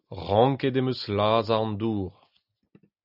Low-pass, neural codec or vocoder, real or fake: 5.4 kHz; none; real